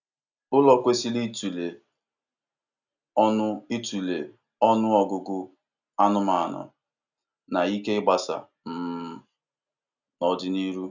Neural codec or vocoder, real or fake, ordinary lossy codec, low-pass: none; real; none; 7.2 kHz